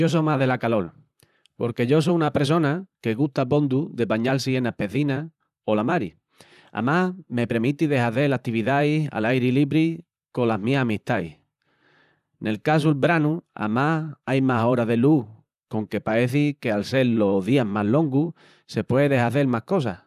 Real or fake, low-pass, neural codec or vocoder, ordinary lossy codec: fake; 14.4 kHz; vocoder, 44.1 kHz, 128 mel bands, Pupu-Vocoder; none